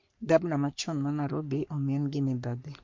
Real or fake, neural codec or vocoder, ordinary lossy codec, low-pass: fake; codec, 44.1 kHz, 3.4 kbps, Pupu-Codec; MP3, 48 kbps; 7.2 kHz